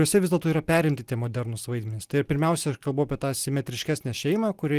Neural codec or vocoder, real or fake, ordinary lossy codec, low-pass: none; real; Opus, 24 kbps; 14.4 kHz